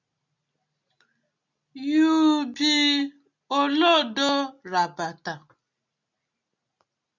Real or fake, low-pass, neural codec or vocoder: real; 7.2 kHz; none